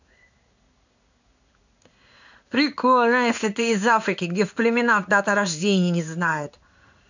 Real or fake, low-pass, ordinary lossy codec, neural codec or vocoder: fake; 7.2 kHz; none; codec, 16 kHz in and 24 kHz out, 1 kbps, XY-Tokenizer